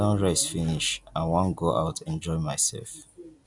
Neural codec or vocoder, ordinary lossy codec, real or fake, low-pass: none; none; real; 10.8 kHz